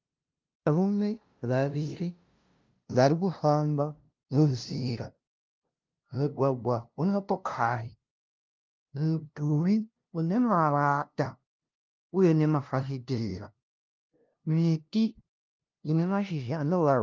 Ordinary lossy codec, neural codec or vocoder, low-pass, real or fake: Opus, 24 kbps; codec, 16 kHz, 0.5 kbps, FunCodec, trained on LibriTTS, 25 frames a second; 7.2 kHz; fake